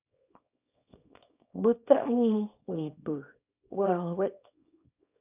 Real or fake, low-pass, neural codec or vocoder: fake; 3.6 kHz; codec, 24 kHz, 0.9 kbps, WavTokenizer, small release